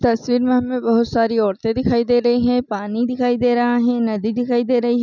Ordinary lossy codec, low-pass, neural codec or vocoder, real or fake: none; 7.2 kHz; none; real